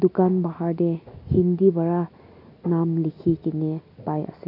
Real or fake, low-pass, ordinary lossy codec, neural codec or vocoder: real; 5.4 kHz; none; none